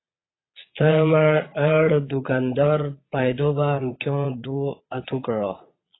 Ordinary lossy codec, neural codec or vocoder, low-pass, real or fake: AAC, 16 kbps; vocoder, 22.05 kHz, 80 mel bands, Vocos; 7.2 kHz; fake